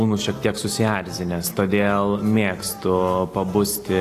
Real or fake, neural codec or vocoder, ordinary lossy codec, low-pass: real; none; AAC, 48 kbps; 14.4 kHz